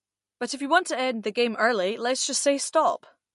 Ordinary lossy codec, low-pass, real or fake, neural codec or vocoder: MP3, 48 kbps; 14.4 kHz; real; none